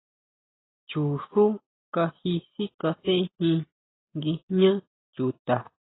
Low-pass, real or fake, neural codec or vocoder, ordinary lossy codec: 7.2 kHz; real; none; AAC, 16 kbps